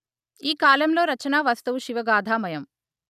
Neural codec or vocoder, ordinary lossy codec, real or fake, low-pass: none; none; real; 14.4 kHz